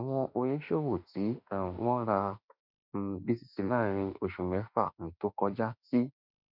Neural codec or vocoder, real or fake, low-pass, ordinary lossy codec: autoencoder, 48 kHz, 32 numbers a frame, DAC-VAE, trained on Japanese speech; fake; 5.4 kHz; none